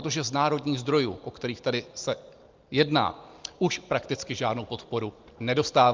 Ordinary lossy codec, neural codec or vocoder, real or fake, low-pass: Opus, 24 kbps; none; real; 7.2 kHz